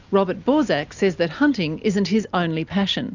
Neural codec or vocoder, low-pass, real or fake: none; 7.2 kHz; real